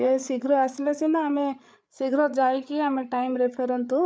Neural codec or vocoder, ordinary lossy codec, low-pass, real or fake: codec, 16 kHz, 8 kbps, FreqCodec, larger model; none; none; fake